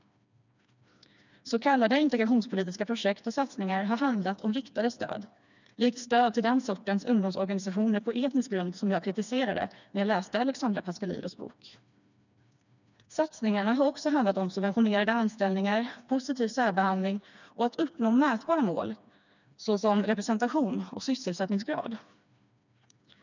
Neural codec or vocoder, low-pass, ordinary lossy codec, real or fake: codec, 16 kHz, 2 kbps, FreqCodec, smaller model; 7.2 kHz; none; fake